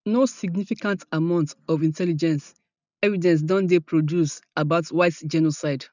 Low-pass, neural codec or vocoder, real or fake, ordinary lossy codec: 7.2 kHz; none; real; none